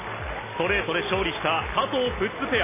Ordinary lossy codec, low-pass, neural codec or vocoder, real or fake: MP3, 16 kbps; 3.6 kHz; none; real